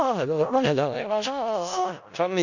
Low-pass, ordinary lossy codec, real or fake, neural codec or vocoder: 7.2 kHz; none; fake; codec, 16 kHz in and 24 kHz out, 0.4 kbps, LongCat-Audio-Codec, four codebook decoder